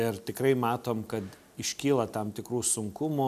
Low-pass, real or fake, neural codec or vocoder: 14.4 kHz; real; none